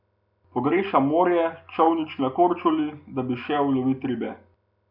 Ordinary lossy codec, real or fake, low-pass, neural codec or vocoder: none; real; 5.4 kHz; none